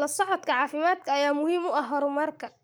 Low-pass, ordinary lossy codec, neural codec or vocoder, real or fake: none; none; codec, 44.1 kHz, 7.8 kbps, Pupu-Codec; fake